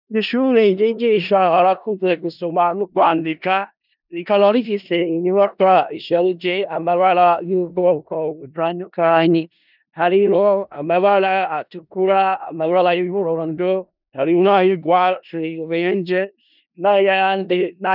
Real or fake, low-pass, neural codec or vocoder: fake; 5.4 kHz; codec, 16 kHz in and 24 kHz out, 0.4 kbps, LongCat-Audio-Codec, four codebook decoder